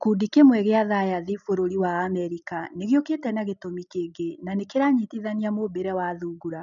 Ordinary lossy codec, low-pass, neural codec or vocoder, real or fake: none; 7.2 kHz; none; real